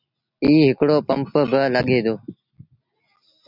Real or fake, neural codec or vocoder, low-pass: real; none; 5.4 kHz